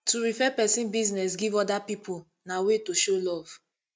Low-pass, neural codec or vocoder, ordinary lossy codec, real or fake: none; none; none; real